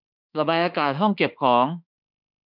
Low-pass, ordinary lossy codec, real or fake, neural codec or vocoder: 5.4 kHz; none; fake; autoencoder, 48 kHz, 32 numbers a frame, DAC-VAE, trained on Japanese speech